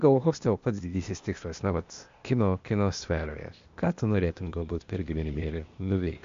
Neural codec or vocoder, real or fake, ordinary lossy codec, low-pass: codec, 16 kHz, 0.8 kbps, ZipCodec; fake; MP3, 48 kbps; 7.2 kHz